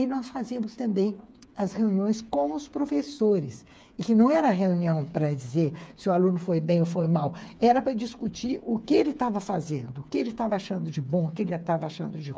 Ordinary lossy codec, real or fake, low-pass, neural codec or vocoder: none; fake; none; codec, 16 kHz, 8 kbps, FreqCodec, smaller model